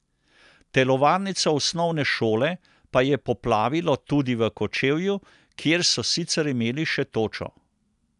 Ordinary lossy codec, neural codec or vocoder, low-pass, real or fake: none; none; 10.8 kHz; real